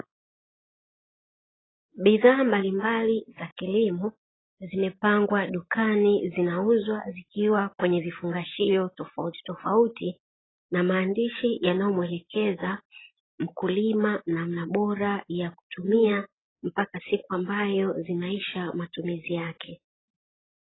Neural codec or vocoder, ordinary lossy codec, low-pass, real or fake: none; AAC, 16 kbps; 7.2 kHz; real